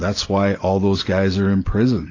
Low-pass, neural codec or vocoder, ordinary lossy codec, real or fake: 7.2 kHz; none; AAC, 32 kbps; real